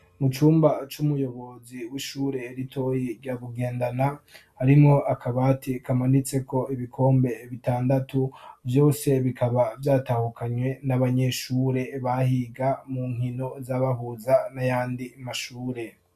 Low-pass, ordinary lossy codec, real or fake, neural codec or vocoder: 14.4 kHz; MP3, 96 kbps; real; none